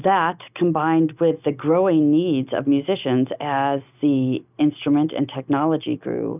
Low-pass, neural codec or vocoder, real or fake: 3.6 kHz; none; real